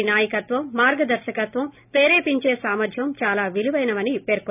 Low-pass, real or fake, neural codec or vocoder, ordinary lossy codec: 3.6 kHz; real; none; none